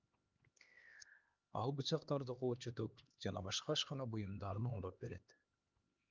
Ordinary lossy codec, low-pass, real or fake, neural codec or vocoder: Opus, 24 kbps; 7.2 kHz; fake; codec, 16 kHz, 2 kbps, X-Codec, HuBERT features, trained on LibriSpeech